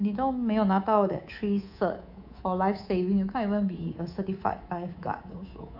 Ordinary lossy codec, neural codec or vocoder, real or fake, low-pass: AAC, 48 kbps; codec, 24 kHz, 3.1 kbps, DualCodec; fake; 5.4 kHz